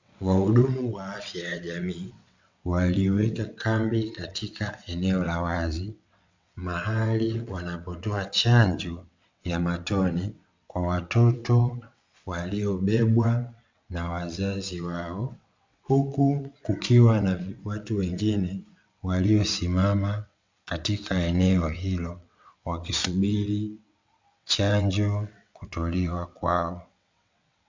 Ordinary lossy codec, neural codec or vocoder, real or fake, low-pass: MP3, 64 kbps; vocoder, 22.05 kHz, 80 mel bands, WaveNeXt; fake; 7.2 kHz